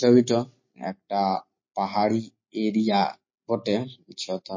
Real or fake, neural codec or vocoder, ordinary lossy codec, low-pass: real; none; MP3, 32 kbps; 7.2 kHz